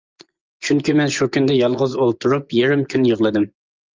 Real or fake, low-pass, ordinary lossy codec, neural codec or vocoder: fake; 7.2 kHz; Opus, 24 kbps; vocoder, 24 kHz, 100 mel bands, Vocos